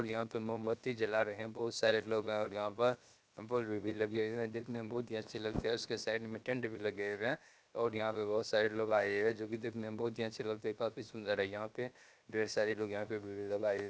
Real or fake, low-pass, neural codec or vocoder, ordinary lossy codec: fake; none; codec, 16 kHz, 0.7 kbps, FocalCodec; none